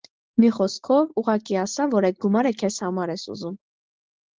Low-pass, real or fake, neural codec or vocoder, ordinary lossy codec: 7.2 kHz; real; none; Opus, 16 kbps